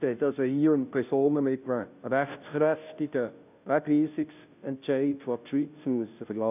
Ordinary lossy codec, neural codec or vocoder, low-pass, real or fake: none; codec, 16 kHz, 0.5 kbps, FunCodec, trained on Chinese and English, 25 frames a second; 3.6 kHz; fake